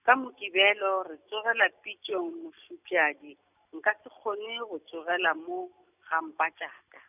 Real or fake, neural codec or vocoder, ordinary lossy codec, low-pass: real; none; none; 3.6 kHz